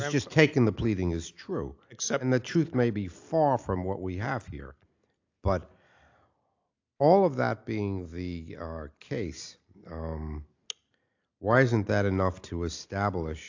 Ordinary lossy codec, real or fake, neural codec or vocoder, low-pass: AAC, 48 kbps; real; none; 7.2 kHz